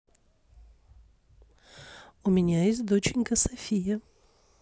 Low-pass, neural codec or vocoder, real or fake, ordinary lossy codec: none; none; real; none